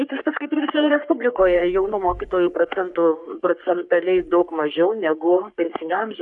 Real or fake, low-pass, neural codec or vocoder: fake; 10.8 kHz; codec, 44.1 kHz, 3.4 kbps, Pupu-Codec